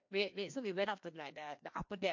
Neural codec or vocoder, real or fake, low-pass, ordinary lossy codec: codec, 16 kHz, 1 kbps, FreqCodec, larger model; fake; 7.2 kHz; MP3, 48 kbps